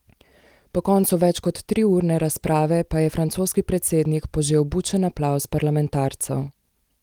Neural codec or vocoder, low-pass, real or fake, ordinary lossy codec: none; 19.8 kHz; real; Opus, 24 kbps